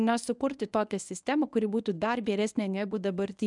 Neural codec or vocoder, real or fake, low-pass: codec, 24 kHz, 0.9 kbps, WavTokenizer, medium speech release version 1; fake; 10.8 kHz